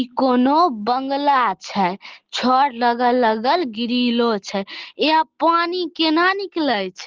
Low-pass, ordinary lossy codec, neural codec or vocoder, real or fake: 7.2 kHz; Opus, 16 kbps; none; real